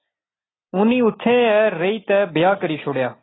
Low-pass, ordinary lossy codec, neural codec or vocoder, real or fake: 7.2 kHz; AAC, 16 kbps; none; real